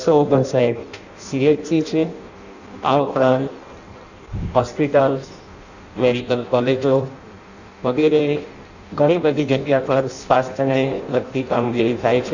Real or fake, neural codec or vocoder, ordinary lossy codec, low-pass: fake; codec, 16 kHz in and 24 kHz out, 0.6 kbps, FireRedTTS-2 codec; none; 7.2 kHz